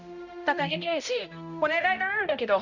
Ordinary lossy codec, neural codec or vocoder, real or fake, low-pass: none; codec, 16 kHz, 0.5 kbps, X-Codec, HuBERT features, trained on balanced general audio; fake; 7.2 kHz